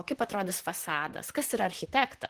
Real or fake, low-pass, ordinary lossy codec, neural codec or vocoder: fake; 14.4 kHz; Opus, 16 kbps; vocoder, 44.1 kHz, 128 mel bands, Pupu-Vocoder